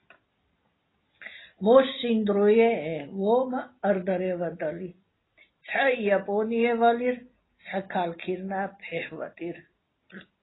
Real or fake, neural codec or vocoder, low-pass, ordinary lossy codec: real; none; 7.2 kHz; AAC, 16 kbps